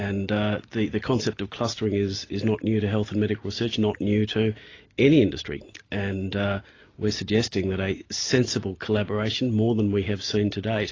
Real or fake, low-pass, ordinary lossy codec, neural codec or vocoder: real; 7.2 kHz; AAC, 32 kbps; none